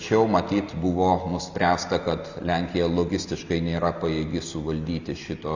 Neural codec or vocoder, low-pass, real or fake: none; 7.2 kHz; real